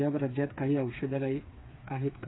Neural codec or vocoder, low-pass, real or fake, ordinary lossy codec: codec, 16 kHz, 4 kbps, FreqCodec, smaller model; 7.2 kHz; fake; AAC, 16 kbps